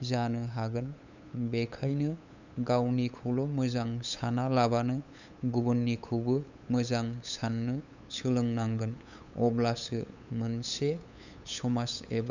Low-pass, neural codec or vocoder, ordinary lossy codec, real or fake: 7.2 kHz; codec, 16 kHz, 8 kbps, FunCodec, trained on LibriTTS, 25 frames a second; none; fake